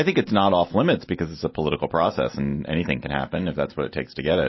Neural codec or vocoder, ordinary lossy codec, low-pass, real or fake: none; MP3, 24 kbps; 7.2 kHz; real